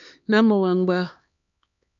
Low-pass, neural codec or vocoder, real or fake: 7.2 kHz; codec, 16 kHz, 2 kbps, X-Codec, HuBERT features, trained on LibriSpeech; fake